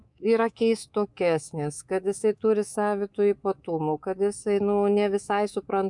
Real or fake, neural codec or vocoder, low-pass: fake; codec, 24 kHz, 3.1 kbps, DualCodec; 10.8 kHz